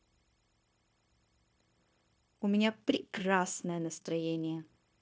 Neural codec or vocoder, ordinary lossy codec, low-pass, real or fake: codec, 16 kHz, 0.9 kbps, LongCat-Audio-Codec; none; none; fake